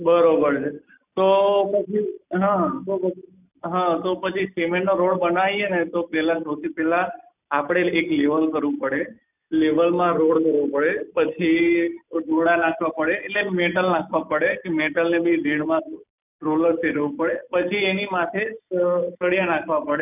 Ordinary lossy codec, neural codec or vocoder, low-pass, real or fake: none; none; 3.6 kHz; real